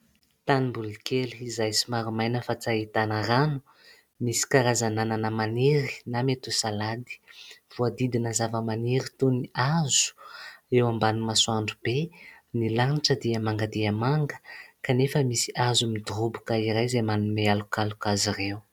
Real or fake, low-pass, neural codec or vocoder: real; 19.8 kHz; none